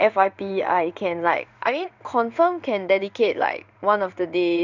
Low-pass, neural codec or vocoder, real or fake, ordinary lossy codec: 7.2 kHz; codec, 16 kHz in and 24 kHz out, 1 kbps, XY-Tokenizer; fake; none